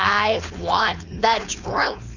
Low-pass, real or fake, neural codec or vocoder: 7.2 kHz; fake; codec, 16 kHz, 4.8 kbps, FACodec